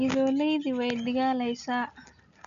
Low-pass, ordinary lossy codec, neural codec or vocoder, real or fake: 7.2 kHz; none; none; real